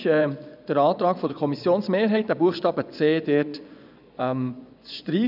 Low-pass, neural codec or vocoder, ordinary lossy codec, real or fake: 5.4 kHz; vocoder, 24 kHz, 100 mel bands, Vocos; none; fake